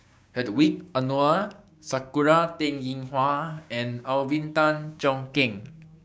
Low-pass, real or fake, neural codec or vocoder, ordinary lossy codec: none; fake; codec, 16 kHz, 6 kbps, DAC; none